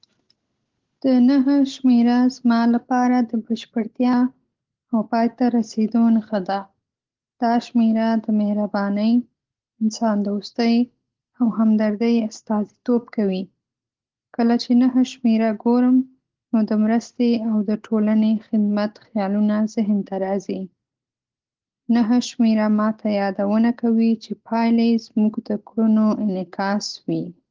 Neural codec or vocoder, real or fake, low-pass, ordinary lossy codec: none; real; 7.2 kHz; Opus, 16 kbps